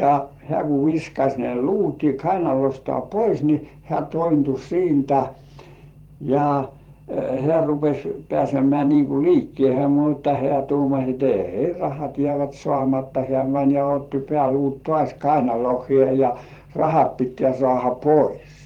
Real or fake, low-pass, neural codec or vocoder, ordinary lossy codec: fake; 19.8 kHz; vocoder, 44.1 kHz, 128 mel bands every 512 samples, BigVGAN v2; Opus, 24 kbps